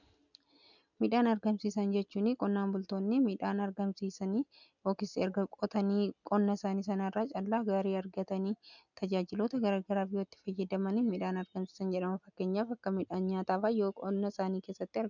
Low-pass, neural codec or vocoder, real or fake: 7.2 kHz; none; real